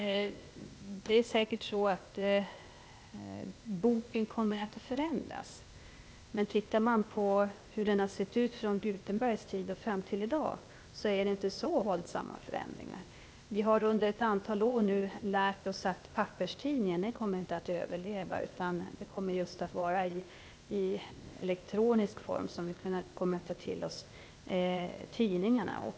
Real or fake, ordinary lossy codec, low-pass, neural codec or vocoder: fake; none; none; codec, 16 kHz, 0.8 kbps, ZipCodec